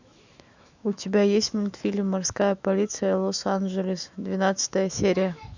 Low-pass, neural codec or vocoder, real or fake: 7.2 kHz; autoencoder, 48 kHz, 128 numbers a frame, DAC-VAE, trained on Japanese speech; fake